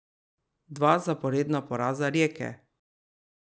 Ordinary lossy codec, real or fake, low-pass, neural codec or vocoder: none; real; none; none